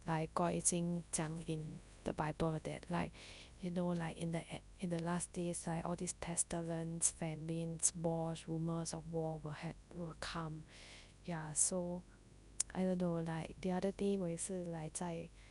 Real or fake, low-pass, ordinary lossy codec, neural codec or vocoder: fake; 10.8 kHz; none; codec, 24 kHz, 0.9 kbps, WavTokenizer, large speech release